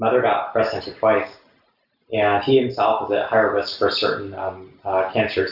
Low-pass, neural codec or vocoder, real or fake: 5.4 kHz; none; real